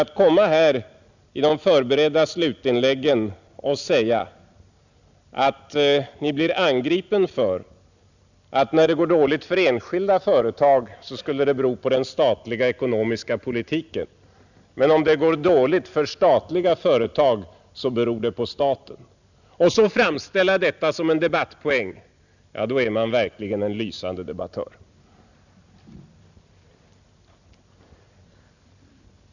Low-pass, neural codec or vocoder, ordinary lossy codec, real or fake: 7.2 kHz; none; none; real